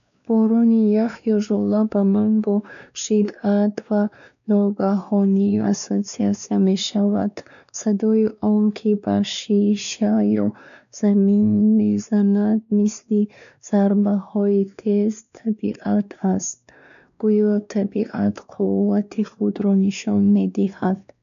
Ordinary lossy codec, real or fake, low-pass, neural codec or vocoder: none; fake; 7.2 kHz; codec, 16 kHz, 2 kbps, X-Codec, WavLM features, trained on Multilingual LibriSpeech